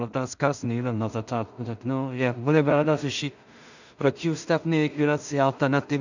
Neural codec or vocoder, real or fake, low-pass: codec, 16 kHz in and 24 kHz out, 0.4 kbps, LongCat-Audio-Codec, two codebook decoder; fake; 7.2 kHz